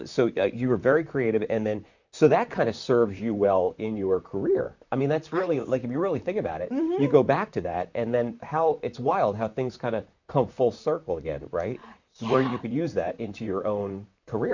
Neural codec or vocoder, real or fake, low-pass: vocoder, 44.1 kHz, 128 mel bands, Pupu-Vocoder; fake; 7.2 kHz